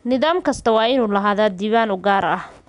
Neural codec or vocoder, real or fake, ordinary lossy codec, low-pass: none; real; none; 10.8 kHz